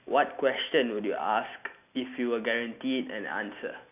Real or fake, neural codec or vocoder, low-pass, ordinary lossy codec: real; none; 3.6 kHz; none